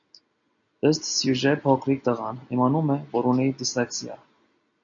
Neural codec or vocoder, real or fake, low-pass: none; real; 7.2 kHz